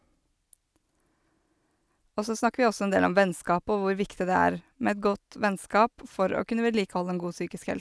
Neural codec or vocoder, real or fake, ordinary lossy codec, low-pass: none; real; none; none